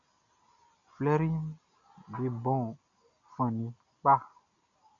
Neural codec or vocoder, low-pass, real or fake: none; 7.2 kHz; real